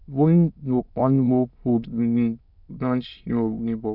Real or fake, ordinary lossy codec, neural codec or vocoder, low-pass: fake; none; autoencoder, 22.05 kHz, a latent of 192 numbers a frame, VITS, trained on many speakers; 5.4 kHz